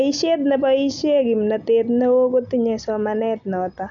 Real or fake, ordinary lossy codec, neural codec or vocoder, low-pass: real; none; none; 7.2 kHz